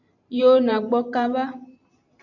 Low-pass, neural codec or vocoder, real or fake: 7.2 kHz; vocoder, 44.1 kHz, 128 mel bands every 512 samples, BigVGAN v2; fake